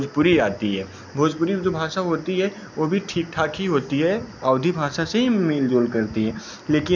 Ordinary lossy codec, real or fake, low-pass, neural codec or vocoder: none; real; 7.2 kHz; none